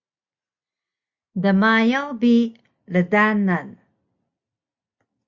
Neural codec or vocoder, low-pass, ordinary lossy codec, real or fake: none; 7.2 kHz; Opus, 64 kbps; real